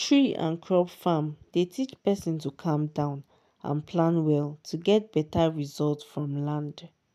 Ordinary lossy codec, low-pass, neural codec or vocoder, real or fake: none; 14.4 kHz; none; real